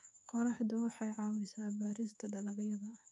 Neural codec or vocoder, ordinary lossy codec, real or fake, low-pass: codec, 24 kHz, 3.1 kbps, DualCodec; none; fake; none